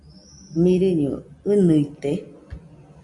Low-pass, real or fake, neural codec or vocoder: 10.8 kHz; real; none